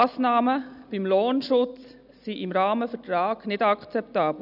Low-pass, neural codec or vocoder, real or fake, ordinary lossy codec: 5.4 kHz; none; real; none